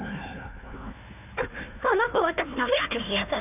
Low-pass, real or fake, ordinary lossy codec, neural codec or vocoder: 3.6 kHz; fake; none; codec, 16 kHz, 1 kbps, FunCodec, trained on Chinese and English, 50 frames a second